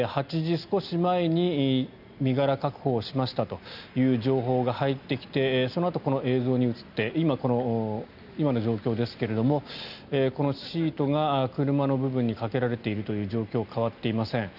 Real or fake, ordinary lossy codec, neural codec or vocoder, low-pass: real; none; none; 5.4 kHz